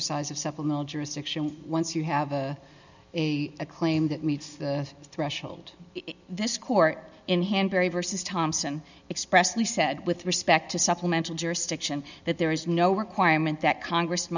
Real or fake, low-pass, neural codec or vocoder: real; 7.2 kHz; none